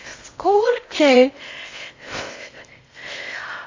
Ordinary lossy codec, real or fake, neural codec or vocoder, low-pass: MP3, 32 kbps; fake; codec, 16 kHz in and 24 kHz out, 0.6 kbps, FocalCodec, streaming, 2048 codes; 7.2 kHz